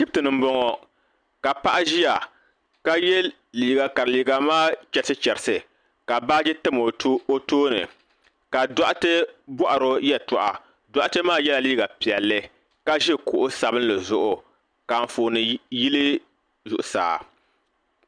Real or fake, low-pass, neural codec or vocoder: real; 9.9 kHz; none